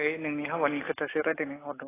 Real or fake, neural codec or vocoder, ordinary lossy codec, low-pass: real; none; AAC, 16 kbps; 3.6 kHz